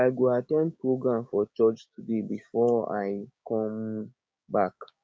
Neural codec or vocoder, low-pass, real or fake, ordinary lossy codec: codec, 16 kHz, 6 kbps, DAC; none; fake; none